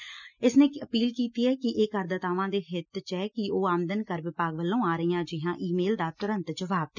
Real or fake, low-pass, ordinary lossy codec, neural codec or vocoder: real; 7.2 kHz; none; none